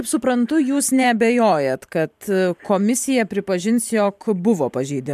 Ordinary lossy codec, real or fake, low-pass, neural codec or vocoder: MP3, 96 kbps; fake; 14.4 kHz; vocoder, 44.1 kHz, 128 mel bands every 512 samples, BigVGAN v2